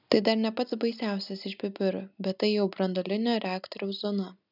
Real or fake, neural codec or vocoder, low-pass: real; none; 5.4 kHz